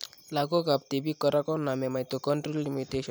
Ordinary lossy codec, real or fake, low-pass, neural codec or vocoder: none; real; none; none